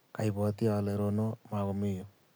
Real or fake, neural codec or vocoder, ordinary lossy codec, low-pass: real; none; none; none